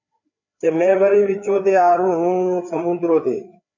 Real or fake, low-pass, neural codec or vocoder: fake; 7.2 kHz; codec, 16 kHz, 4 kbps, FreqCodec, larger model